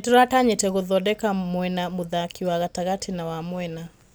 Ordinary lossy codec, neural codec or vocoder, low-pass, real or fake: none; none; none; real